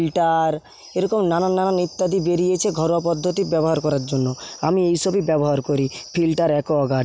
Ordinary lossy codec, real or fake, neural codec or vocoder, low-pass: none; real; none; none